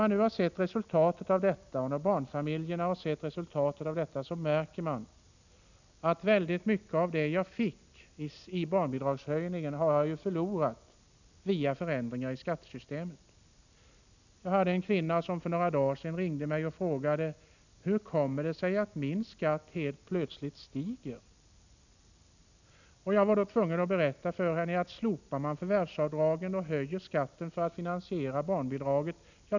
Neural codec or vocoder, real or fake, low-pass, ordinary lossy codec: none; real; 7.2 kHz; none